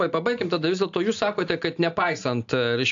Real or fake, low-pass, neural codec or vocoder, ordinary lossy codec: real; 7.2 kHz; none; AAC, 64 kbps